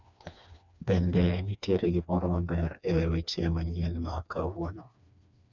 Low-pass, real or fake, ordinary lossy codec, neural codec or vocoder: 7.2 kHz; fake; none; codec, 16 kHz, 2 kbps, FreqCodec, smaller model